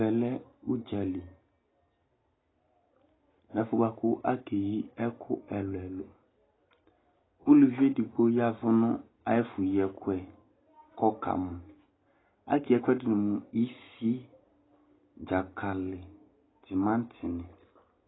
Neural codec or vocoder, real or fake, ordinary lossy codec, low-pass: none; real; AAC, 16 kbps; 7.2 kHz